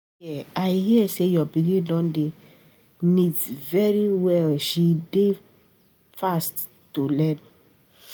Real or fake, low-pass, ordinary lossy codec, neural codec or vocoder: real; none; none; none